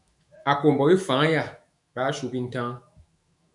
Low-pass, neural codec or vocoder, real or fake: 10.8 kHz; autoencoder, 48 kHz, 128 numbers a frame, DAC-VAE, trained on Japanese speech; fake